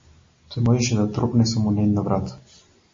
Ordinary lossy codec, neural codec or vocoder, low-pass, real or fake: MP3, 32 kbps; none; 7.2 kHz; real